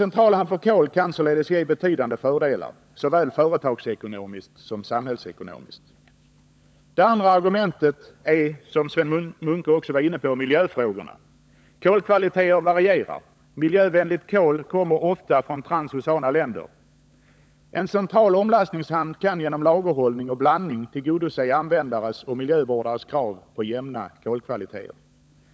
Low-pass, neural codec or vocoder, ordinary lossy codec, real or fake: none; codec, 16 kHz, 16 kbps, FunCodec, trained on LibriTTS, 50 frames a second; none; fake